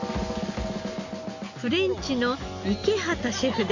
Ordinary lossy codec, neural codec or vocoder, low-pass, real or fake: none; none; 7.2 kHz; real